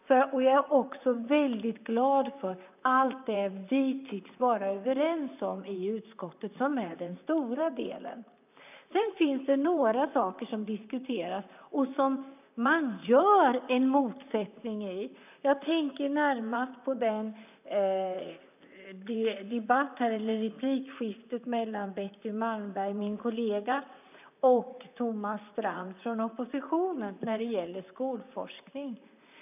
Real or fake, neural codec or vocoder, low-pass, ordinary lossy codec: fake; vocoder, 44.1 kHz, 128 mel bands, Pupu-Vocoder; 3.6 kHz; none